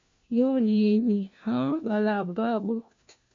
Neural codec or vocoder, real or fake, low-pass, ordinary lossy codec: codec, 16 kHz, 1 kbps, FunCodec, trained on LibriTTS, 50 frames a second; fake; 7.2 kHz; MP3, 48 kbps